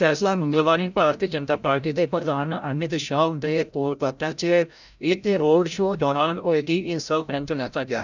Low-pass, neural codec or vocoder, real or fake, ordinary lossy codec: 7.2 kHz; codec, 16 kHz, 0.5 kbps, FreqCodec, larger model; fake; none